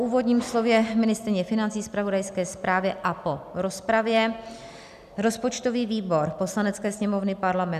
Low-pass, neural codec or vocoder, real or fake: 14.4 kHz; none; real